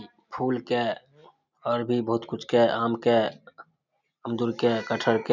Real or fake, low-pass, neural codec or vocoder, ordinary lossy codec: real; 7.2 kHz; none; none